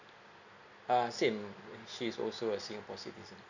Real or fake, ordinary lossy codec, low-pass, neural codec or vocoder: real; none; 7.2 kHz; none